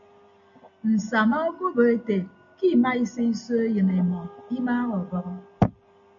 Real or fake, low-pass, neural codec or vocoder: real; 7.2 kHz; none